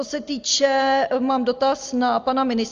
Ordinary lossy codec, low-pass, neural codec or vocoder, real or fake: Opus, 32 kbps; 7.2 kHz; none; real